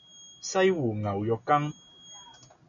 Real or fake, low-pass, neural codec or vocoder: real; 7.2 kHz; none